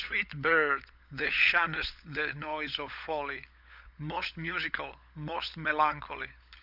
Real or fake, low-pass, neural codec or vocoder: fake; 5.4 kHz; codec, 16 kHz, 16 kbps, FunCodec, trained on LibriTTS, 50 frames a second